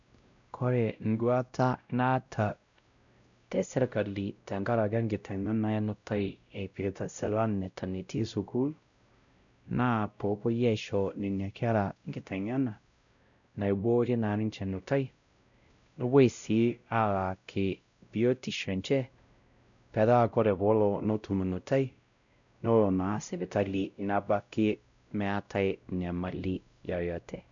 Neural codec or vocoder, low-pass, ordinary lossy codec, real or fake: codec, 16 kHz, 0.5 kbps, X-Codec, WavLM features, trained on Multilingual LibriSpeech; 7.2 kHz; none; fake